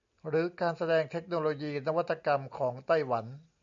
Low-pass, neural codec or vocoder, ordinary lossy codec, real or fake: 7.2 kHz; none; MP3, 96 kbps; real